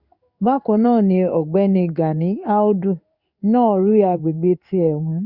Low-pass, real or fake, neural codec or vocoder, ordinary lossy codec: 5.4 kHz; fake; codec, 16 kHz in and 24 kHz out, 1 kbps, XY-Tokenizer; Opus, 64 kbps